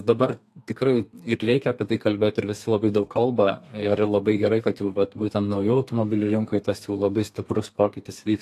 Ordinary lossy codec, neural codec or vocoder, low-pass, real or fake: AAC, 64 kbps; codec, 32 kHz, 1.9 kbps, SNAC; 14.4 kHz; fake